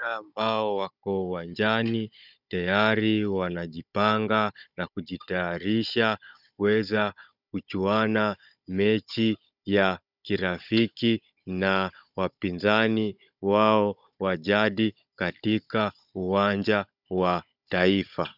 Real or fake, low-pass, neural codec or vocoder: fake; 5.4 kHz; codec, 16 kHz, 16 kbps, FunCodec, trained on Chinese and English, 50 frames a second